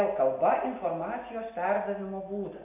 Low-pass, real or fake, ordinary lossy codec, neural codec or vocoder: 3.6 kHz; real; AAC, 32 kbps; none